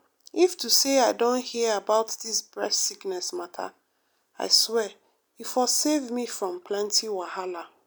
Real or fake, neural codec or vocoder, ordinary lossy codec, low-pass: real; none; none; none